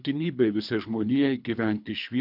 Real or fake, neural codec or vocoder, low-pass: fake; codec, 24 kHz, 3 kbps, HILCodec; 5.4 kHz